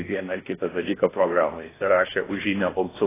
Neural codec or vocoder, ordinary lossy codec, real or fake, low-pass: codec, 16 kHz in and 24 kHz out, 0.6 kbps, FocalCodec, streaming, 4096 codes; AAC, 16 kbps; fake; 3.6 kHz